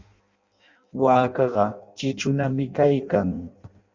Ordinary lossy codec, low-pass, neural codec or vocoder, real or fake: Opus, 64 kbps; 7.2 kHz; codec, 16 kHz in and 24 kHz out, 0.6 kbps, FireRedTTS-2 codec; fake